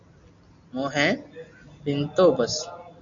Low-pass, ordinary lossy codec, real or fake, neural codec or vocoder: 7.2 kHz; AAC, 64 kbps; real; none